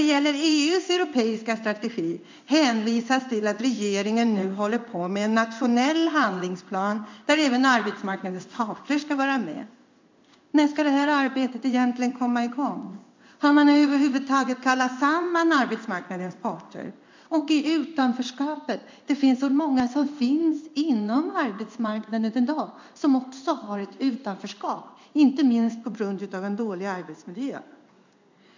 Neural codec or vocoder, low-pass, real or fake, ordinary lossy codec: codec, 16 kHz in and 24 kHz out, 1 kbps, XY-Tokenizer; 7.2 kHz; fake; MP3, 64 kbps